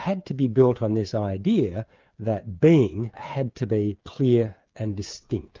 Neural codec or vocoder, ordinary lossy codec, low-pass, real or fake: codec, 16 kHz, 8 kbps, FreqCodec, smaller model; Opus, 32 kbps; 7.2 kHz; fake